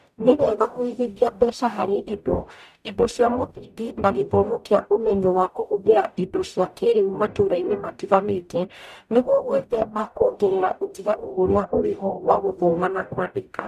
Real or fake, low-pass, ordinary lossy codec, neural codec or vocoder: fake; 14.4 kHz; none; codec, 44.1 kHz, 0.9 kbps, DAC